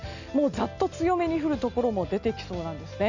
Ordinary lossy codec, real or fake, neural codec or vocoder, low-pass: none; real; none; 7.2 kHz